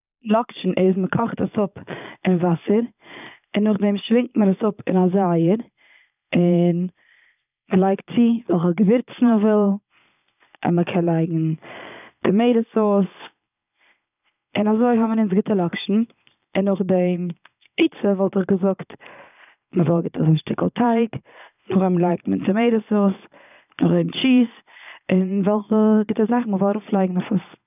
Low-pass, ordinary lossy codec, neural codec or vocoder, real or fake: 3.6 kHz; none; vocoder, 22.05 kHz, 80 mel bands, Vocos; fake